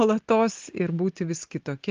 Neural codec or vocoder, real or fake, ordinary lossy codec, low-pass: none; real; Opus, 24 kbps; 7.2 kHz